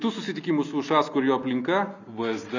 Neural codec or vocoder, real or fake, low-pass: none; real; 7.2 kHz